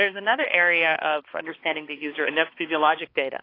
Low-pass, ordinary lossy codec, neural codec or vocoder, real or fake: 5.4 kHz; AAC, 32 kbps; codec, 16 kHz, 2 kbps, X-Codec, HuBERT features, trained on balanced general audio; fake